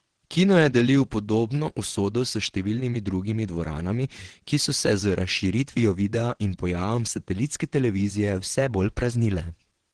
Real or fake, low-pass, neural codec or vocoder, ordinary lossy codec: fake; 9.9 kHz; vocoder, 22.05 kHz, 80 mel bands, WaveNeXt; Opus, 16 kbps